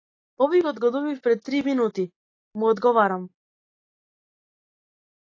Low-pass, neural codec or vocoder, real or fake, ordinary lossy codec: 7.2 kHz; none; real; AAC, 32 kbps